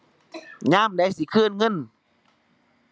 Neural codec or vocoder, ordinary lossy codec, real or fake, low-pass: none; none; real; none